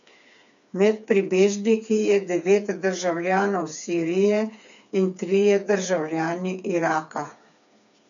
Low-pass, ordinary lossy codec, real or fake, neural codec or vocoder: 7.2 kHz; none; fake; codec, 16 kHz, 4 kbps, FreqCodec, smaller model